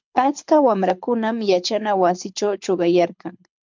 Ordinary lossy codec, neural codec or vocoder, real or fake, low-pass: MP3, 64 kbps; codec, 24 kHz, 6 kbps, HILCodec; fake; 7.2 kHz